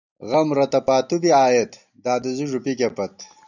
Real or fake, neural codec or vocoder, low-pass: real; none; 7.2 kHz